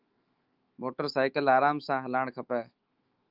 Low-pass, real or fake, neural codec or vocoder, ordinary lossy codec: 5.4 kHz; fake; autoencoder, 48 kHz, 128 numbers a frame, DAC-VAE, trained on Japanese speech; Opus, 32 kbps